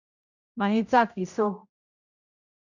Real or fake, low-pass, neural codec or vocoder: fake; 7.2 kHz; codec, 16 kHz, 0.5 kbps, X-Codec, HuBERT features, trained on general audio